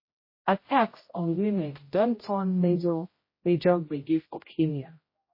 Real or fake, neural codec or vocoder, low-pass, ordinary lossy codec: fake; codec, 16 kHz, 0.5 kbps, X-Codec, HuBERT features, trained on general audio; 5.4 kHz; MP3, 24 kbps